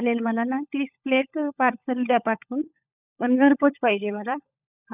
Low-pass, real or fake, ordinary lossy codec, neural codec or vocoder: 3.6 kHz; fake; none; codec, 16 kHz, 16 kbps, FunCodec, trained on LibriTTS, 50 frames a second